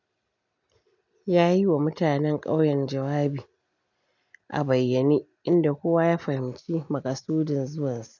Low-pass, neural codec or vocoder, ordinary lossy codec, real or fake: 7.2 kHz; none; none; real